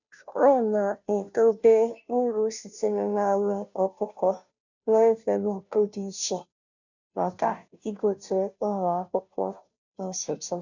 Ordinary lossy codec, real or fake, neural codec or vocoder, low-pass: none; fake; codec, 16 kHz, 0.5 kbps, FunCodec, trained on Chinese and English, 25 frames a second; 7.2 kHz